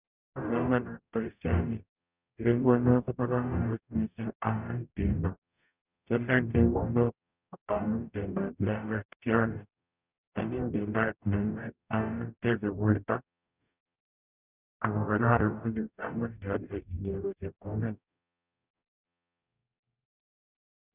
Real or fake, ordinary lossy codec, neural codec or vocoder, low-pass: fake; none; codec, 44.1 kHz, 0.9 kbps, DAC; 3.6 kHz